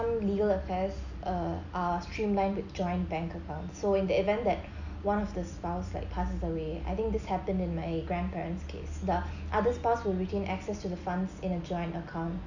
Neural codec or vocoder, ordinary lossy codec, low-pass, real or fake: none; none; 7.2 kHz; real